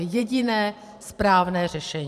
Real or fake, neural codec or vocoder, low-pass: fake; vocoder, 44.1 kHz, 128 mel bands every 512 samples, BigVGAN v2; 14.4 kHz